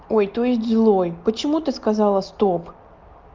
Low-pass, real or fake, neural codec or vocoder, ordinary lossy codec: 7.2 kHz; real; none; Opus, 24 kbps